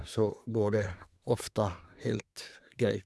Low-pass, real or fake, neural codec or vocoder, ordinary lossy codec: none; fake; codec, 24 kHz, 1 kbps, SNAC; none